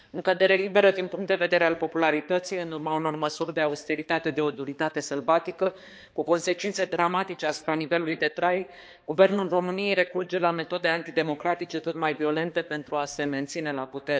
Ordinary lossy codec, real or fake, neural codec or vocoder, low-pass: none; fake; codec, 16 kHz, 2 kbps, X-Codec, HuBERT features, trained on balanced general audio; none